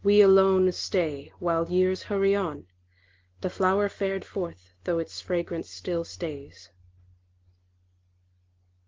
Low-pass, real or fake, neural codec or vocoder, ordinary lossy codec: 7.2 kHz; real; none; Opus, 16 kbps